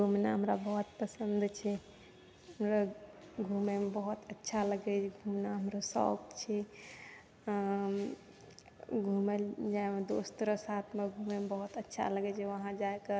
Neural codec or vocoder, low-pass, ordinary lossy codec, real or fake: none; none; none; real